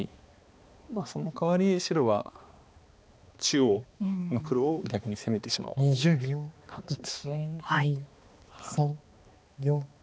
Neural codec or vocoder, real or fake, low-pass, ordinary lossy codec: codec, 16 kHz, 2 kbps, X-Codec, HuBERT features, trained on balanced general audio; fake; none; none